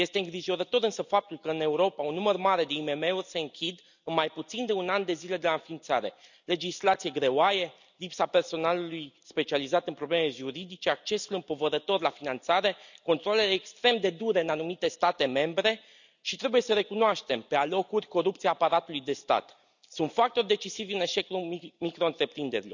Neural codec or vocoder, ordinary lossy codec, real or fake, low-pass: none; none; real; 7.2 kHz